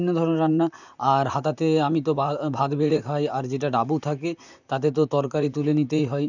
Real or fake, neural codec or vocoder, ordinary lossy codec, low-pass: fake; vocoder, 44.1 kHz, 128 mel bands, Pupu-Vocoder; none; 7.2 kHz